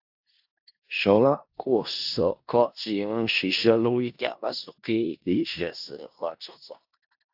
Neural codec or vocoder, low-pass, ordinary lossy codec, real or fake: codec, 16 kHz in and 24 kHz out, 0.4 kbps, LongCat-Audio-Codec, four codebook decoder; 5.4 kHz; AAC, 48 kbps; fake